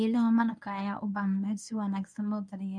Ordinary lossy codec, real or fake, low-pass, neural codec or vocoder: none; fake; 9.9 kHz; codec, 24 kHz, 0.9 kbps, WavTokenizer, medium speech release version 1